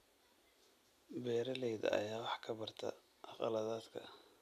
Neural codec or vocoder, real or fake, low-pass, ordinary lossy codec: none; real; 14.4 kHz; none